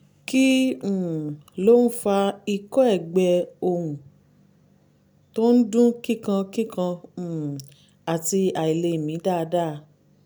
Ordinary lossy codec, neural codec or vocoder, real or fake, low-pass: none; none; real; none